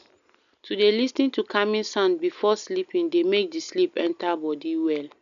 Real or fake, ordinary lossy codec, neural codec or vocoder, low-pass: real; none; none; 7.2 kHz